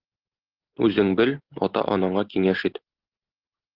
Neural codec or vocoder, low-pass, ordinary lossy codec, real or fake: none; 5.4 kHz; Opus, 16 kbps; real